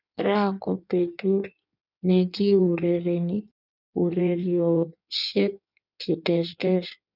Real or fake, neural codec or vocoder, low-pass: fake; codec, 16 kHz in and 24 kHz out, 1.1 kbps, FireRedTTS-2 codec; 5.4 kHz